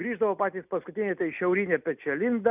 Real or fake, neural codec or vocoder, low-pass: real; none; 3.6 kHz